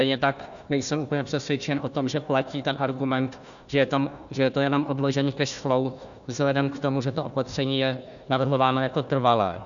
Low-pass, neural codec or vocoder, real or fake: 7.2 kHz; codec, 16 kHz, 1 kbps, FunCodec, trained on Chinese and English, 50 frames a second; fake